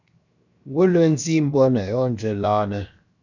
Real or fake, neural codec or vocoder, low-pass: fake; codec, 16 kHz, 0.7 kbps, FocalCodec; 7.2 kHz